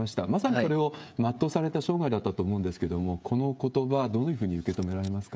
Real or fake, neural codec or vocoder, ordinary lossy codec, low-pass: fake; codec, 16 kHz, 16 kbps, FreqCodec, smaller model; none; none